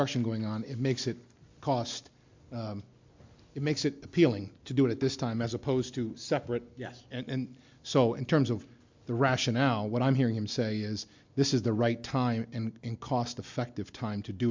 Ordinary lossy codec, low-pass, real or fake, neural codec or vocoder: MP3, 64 kbps; 7.2 kHz; real; none